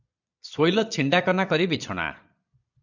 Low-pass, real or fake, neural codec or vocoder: 7.2 kHz; fake; vocoder, 22.05 kHz, 80 mel bands, Vocos